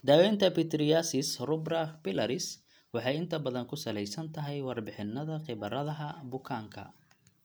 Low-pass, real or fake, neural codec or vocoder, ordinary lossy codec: none; real; none; none